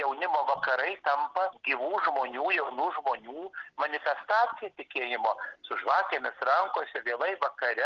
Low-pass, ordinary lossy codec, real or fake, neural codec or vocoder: 7.2 kHz; Opus, 16 kbps; real; none